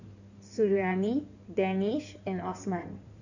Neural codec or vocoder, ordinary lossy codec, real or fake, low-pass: codec, 16 kHz in and 24 kHz out, 2.2 kbps, FireRedTTS-2 codec; none; fake; 7.2 kHz